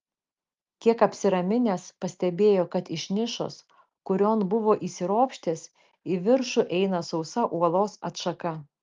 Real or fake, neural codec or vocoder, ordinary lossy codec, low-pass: real; none; Opus, 32 kbps; 7.2 kHz